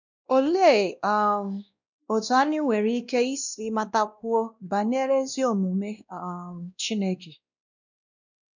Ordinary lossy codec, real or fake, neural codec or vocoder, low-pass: none; fake; codec, 16 kHz, 1 kbps, X-Codec, WavLM features, trained on Multilingual LibriSpeech; 7.2 kHz